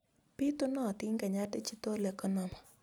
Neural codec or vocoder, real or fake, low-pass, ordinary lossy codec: none; real; none; none